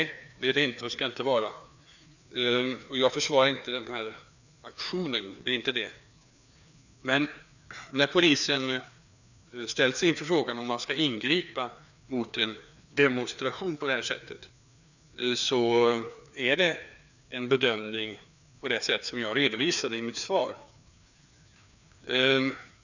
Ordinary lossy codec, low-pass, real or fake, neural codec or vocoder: none; 7.2 kHz; fake; codec, 16 kHz, 2 kbps, FreqCodec, larger model